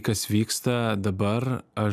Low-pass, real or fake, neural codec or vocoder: 14.4 kHz; real; none